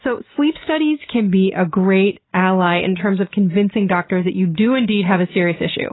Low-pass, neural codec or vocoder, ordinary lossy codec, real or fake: 7.2 kHz; none; AAC, 16 kbps; real